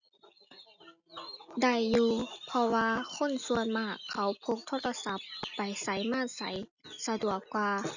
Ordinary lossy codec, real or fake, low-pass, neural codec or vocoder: none; real; 7.2 kHz; none